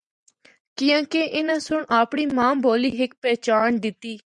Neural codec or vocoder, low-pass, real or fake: vocoder, 24 kHz, 100 mel bands, Vocos; 10.8 kHz; fake